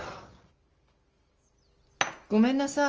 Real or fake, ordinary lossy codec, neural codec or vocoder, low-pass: real; Opus, 24 kbps; none; 7.2 kHz